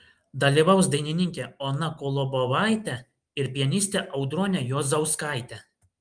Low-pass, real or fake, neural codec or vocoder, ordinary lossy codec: 9.9 kHz; real; none; Opus, 32 kbps